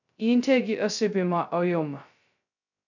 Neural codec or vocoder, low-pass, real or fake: codec, 16 kHz, 0.2 kbps, FocalCodec; 7.2 kHz; fake